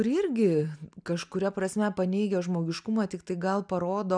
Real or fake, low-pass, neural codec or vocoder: real; 9.9 kHz; none